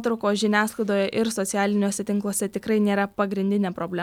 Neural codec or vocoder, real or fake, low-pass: none; real; 19.8 kHz